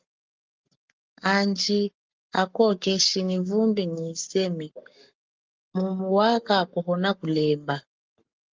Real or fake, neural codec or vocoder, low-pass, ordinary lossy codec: fake; codec, 44.1 kHz, 7.8 kbps, Pupu-Codec; 7.2 kHz; Opus, 32 kbps